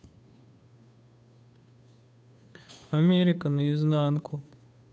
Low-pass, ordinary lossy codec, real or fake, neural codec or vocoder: none; none; fake; codec, 16 kHz, 2 kbps, FunCodec, trained on Chinese and English, 25 frames a second